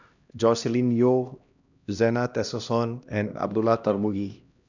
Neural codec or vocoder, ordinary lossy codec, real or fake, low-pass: codec, 16 kHz, 1 kbps, X-Codec, HuBERT features, trained on LibriSpeech; none; fake; 7.2 kHz